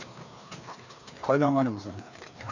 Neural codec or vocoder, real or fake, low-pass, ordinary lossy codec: codec, 16 kHz, 2 kbps, FreqCodec, larger model; fake; 7.2 kHz; none